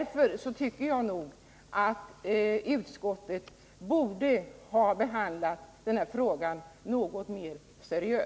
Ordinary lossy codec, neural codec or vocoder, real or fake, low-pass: none; none; real; none